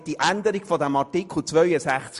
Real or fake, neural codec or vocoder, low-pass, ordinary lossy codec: real; none; 14.4 kHz; MP3, 48 kbps